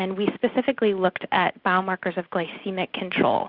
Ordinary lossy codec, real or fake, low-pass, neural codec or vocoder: Opus, 64 kbps; real; 5.4 kHz; none